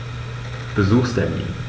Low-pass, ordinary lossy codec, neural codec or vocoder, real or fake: none; none; none; real